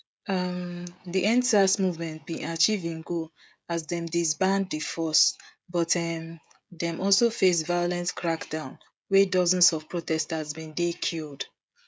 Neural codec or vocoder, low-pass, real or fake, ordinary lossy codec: codec, 16 kHz, 16 kbps, FreqCodec, smaller model; none; fake; none